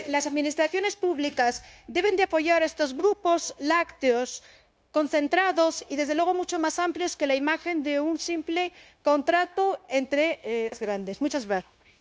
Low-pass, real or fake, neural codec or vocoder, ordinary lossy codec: none; fake; codec, 16 kHz, 0.9 kbps, LongCat-Audio-Codec; none